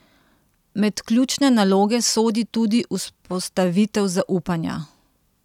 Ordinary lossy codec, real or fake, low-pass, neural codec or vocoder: none; real; 19.8 kHz; none